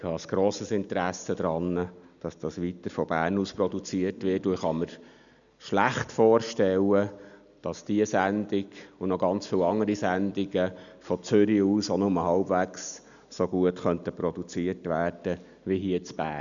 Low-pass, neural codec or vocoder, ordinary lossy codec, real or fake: 7.2 kHz; none; none; real